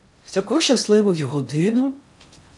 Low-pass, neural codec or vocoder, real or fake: 10.8 kHz; codec, 16 kHz in and 24 kHz out, 0.6 kbps, FocalCodec, streaming, 4096 codes; fake